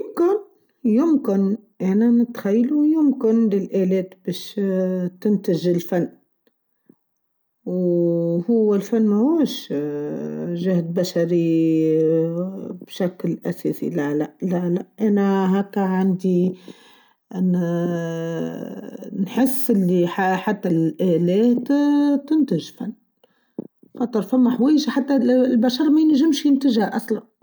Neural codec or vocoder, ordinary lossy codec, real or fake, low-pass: none; none; real; none